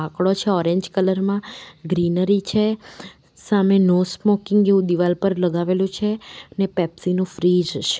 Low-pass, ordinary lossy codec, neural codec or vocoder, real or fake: none; none; none; real